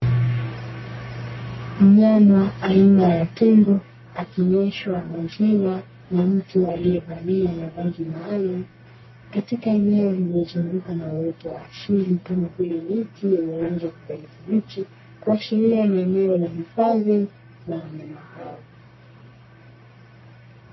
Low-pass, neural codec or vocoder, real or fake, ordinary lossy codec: 7.2 kHz; codec, 44.1 kHz, 1.7 kbps, Pupu-Codec; fake; MP3, 24 kbps